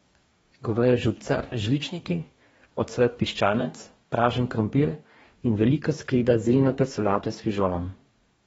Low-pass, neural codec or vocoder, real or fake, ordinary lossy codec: 19.8 kHz; codec, 44.1 kHz, 2.6 kbps, DAC; fake; AAC, 24 kbps